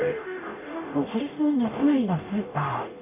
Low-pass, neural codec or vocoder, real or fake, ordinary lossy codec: 3.6 kHz; codec, 44.1 kHz, 0.9 kbps, DAC; fake; none